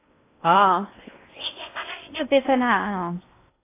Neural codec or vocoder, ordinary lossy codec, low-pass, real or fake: codec, 16 kHz in and 24 kHz out, 0.6 kbps, FocalCodec, streaming, 4096 codes; AAC, 24 kbps; 3.6 kHz; fake